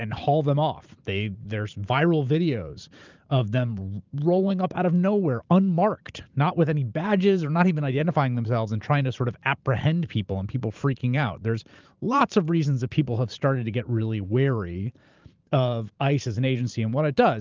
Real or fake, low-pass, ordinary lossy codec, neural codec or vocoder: fake; 7.2 kHz; Opus, 32 kbps; vocoder, 44.1 kHz, 128 mel bands every 512 samples, BigVGAN v2